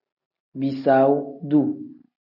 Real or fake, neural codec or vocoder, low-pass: real; none; 5.4 kHz